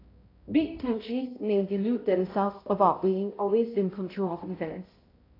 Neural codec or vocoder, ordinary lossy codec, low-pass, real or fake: codec, 16 kHz, 0.5 kbps, X-Codec, HuBERT features, trained on balanced general audio; AAC, 24 kbps; 5.4 kHz; fake